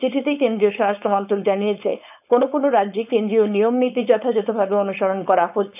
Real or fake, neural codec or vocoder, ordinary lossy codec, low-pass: fake; codec, 16 kHz, 4.8 kbps, FACodec; none; 3.6 kHz